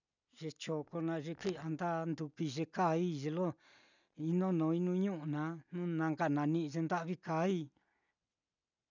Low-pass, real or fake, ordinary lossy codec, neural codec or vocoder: 7.2 kHz; real; none; none